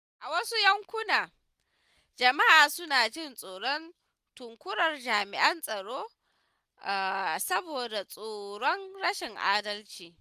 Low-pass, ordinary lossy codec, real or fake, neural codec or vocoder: 14.4 kHz; Opus, 64 kbps; real; none